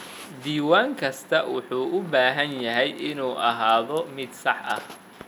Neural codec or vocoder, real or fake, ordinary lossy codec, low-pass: none; real; none; 19.8 kHz